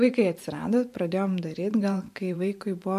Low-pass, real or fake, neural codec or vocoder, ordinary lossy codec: 14.4 kHz; fake; vocoder, 44.1 kHz, 128 mel bands every 512 samples, BigVGAN v2; MP3, 64 kbps